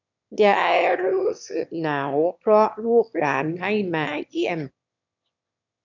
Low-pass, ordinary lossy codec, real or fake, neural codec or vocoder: 7.2 kHz; none; fake; autoencoder, 22.05 kHz, a latent of 192 numbers a frame, VITS, trained on one speaker